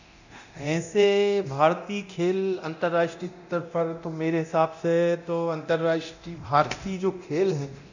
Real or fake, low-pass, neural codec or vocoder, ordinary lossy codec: fake; 7.2 kHz; codec, 24 kHz, 0.9 kbps, DualCodec; none